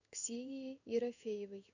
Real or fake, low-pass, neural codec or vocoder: fake; 7.2 kHz; codec, 16 kHz in and 24 kHz out, 1 kbps, XY-Tokenizer